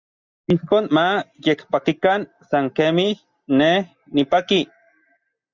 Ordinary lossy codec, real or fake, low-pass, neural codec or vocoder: Opus, 64 kbps; real; 7.2 kHz; none